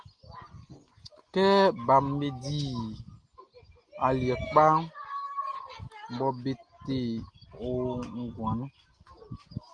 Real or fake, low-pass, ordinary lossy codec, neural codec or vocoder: real; 9.9 kHz; Opus, 24 kbps; none